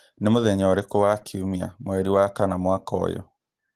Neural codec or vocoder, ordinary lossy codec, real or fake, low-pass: none; Opus, 16 kbps; real; 14.4 kHz